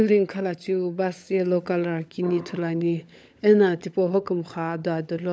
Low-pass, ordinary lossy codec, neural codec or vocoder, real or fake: none; none; codec, 16 kHz, 16 kbps, FunCodec, trained on LibriTTS, 50 frames a second; fake